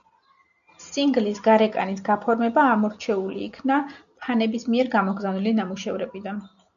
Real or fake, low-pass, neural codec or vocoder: real; 7.2 kHz; none